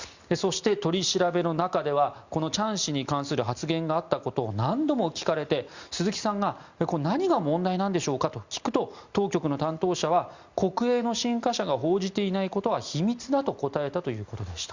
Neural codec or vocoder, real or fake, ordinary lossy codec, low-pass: none; real; Opus, 64 kbps; 7.2 kHz